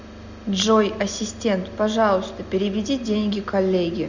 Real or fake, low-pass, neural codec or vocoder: real; 7.2 kHz; none